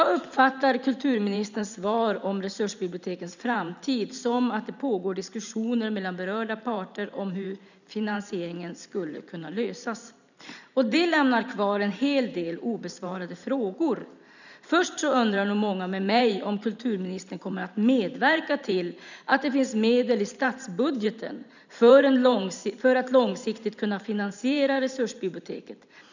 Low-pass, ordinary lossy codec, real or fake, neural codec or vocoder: 7.2 kHz; none; fake; vocoder, 44.1 kHz, 128 mel bands every 512 samples, BigVGAN v2